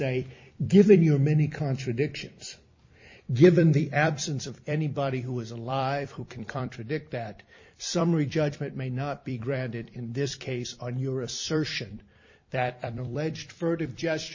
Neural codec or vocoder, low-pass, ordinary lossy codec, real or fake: vocoder, 44.1 kHz, 128 mel bands every 256 samples, BigVGAN v2; 7.2 kHz; MP3, 32 kbps; fake